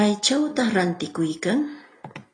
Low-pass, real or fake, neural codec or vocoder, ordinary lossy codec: 9.9 kHz; real; none; AAC, 32 kbps